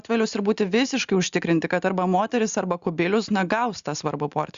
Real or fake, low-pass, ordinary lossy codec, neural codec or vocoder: real; 7.2 kHz; Opus, 64 kbps; none